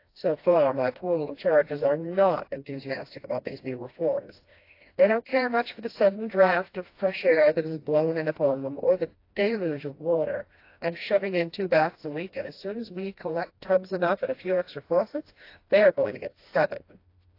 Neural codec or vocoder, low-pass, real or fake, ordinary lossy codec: codec, 16 kHz, 1 kbps, FreqCodec, smaller model; 5.4 kHz; fake; AAC, 32 kbps